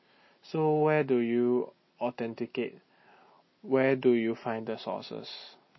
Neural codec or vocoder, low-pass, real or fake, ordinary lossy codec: none; 7.2 kHz; real; MP3, 24 kbps